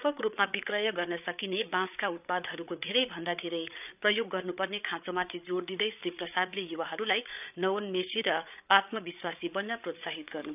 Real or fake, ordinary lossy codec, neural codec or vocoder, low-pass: fake; none; codec, 16 kHz, 8 kbps, FreqCodec, larger model; 3.6 kHz